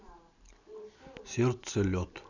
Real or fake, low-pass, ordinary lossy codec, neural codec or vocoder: real; 7.2 kHz; none; none